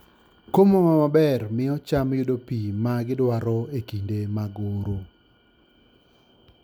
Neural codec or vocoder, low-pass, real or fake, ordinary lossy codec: none; none; real; none